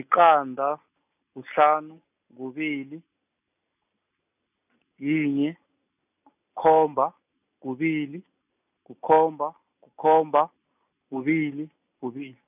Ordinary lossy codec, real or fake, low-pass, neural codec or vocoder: none; real; 3.6 kHz; none